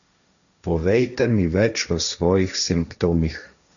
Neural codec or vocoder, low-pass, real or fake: codec, 16 kHz, 1.1 kbps, Voila-Tokenizer; 7.2 kHz; fake